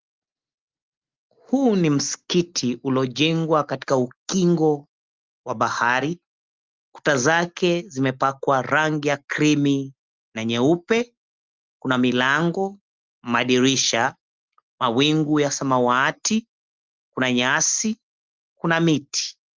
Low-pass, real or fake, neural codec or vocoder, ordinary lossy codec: 7.2 kHz; real; none; Opus, 32 kbps